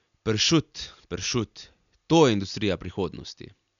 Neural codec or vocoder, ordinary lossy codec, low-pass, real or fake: none; none; 7.2 kHz; real